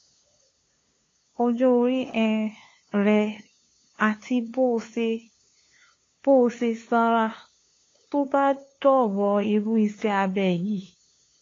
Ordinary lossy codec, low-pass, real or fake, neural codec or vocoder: AAC, 32 kbps; 7.2 kHz; fake; codec, 16 kHz, 2 kbps, FunCodec, trained on LibriTTS, 25 frames a second